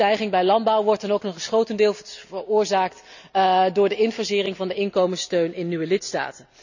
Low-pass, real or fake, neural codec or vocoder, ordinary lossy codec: 7.2 kHz; real; none; none